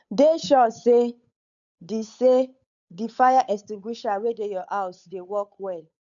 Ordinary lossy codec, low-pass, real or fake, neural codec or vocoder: none; 7.2 kHz; fake; codec, 16 kHz, 8 kbps, FunCodec, trained on Chinese and English, 25 frames a second